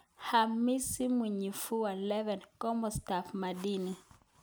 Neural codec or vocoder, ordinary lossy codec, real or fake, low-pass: none; none; real; none